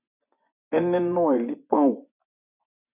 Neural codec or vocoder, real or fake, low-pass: vocoder, 24 kHz, 100 mel bands, Vocos; fake; 3.6 kHz